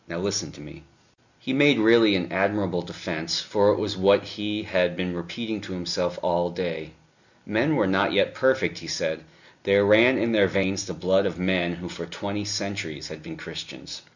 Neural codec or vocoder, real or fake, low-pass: none; real; 7.2 kHz